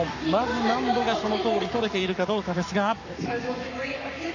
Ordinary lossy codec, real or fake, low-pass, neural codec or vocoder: none; fake; 7.2 kHz; codec, 44.1 kHz, 7.8 kbps, Pupu-Codec